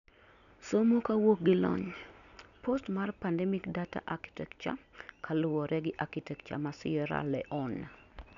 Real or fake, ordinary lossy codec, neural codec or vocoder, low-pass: real; none; none; 7.2 kHz